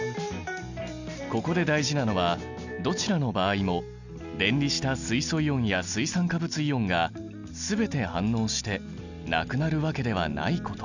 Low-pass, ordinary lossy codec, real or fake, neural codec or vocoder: 7.2 kHz; none; real; none